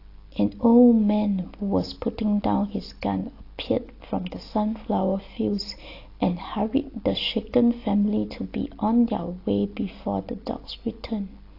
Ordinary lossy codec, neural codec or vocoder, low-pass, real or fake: AAC, 32 kbps; none; 5.4 kHz; real